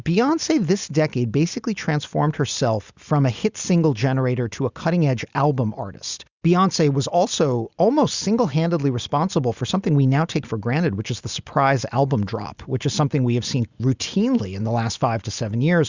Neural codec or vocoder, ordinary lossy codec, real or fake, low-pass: none; Opus, 64 kbps; real; 7.2 kHz